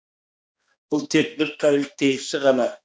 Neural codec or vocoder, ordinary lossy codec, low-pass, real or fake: codec, 16 kHz, 1 kbps, X-Codec, HuBERT features, trained on balanced general audio; none; none; fake